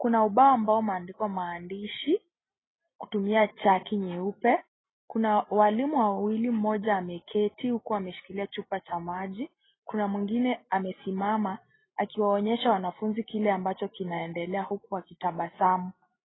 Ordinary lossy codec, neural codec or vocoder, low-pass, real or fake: AAC, 16 kbps; none; 7.2 kHz; real